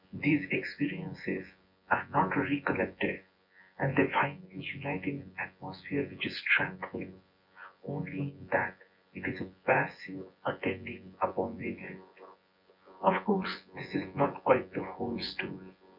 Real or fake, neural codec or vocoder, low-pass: fake; vocoder, 24 kHz, 100 mel bands, Vocos; 5.4 kHz